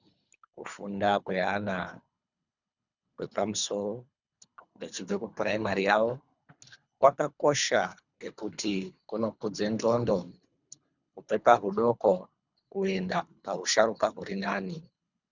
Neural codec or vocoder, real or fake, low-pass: codec, 24 kHz, 3 kbps, HILCodec; fake; 7.2 kHz